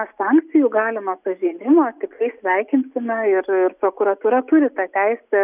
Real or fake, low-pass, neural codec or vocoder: real; 3.6 kHz; none